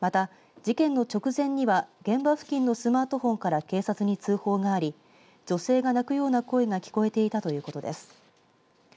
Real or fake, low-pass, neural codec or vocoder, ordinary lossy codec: real; none; none; none